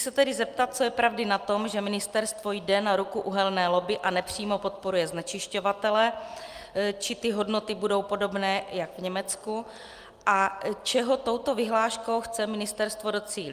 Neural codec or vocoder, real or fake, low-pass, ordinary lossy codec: none; real; 14.4 kHz; Opus, 32 kbps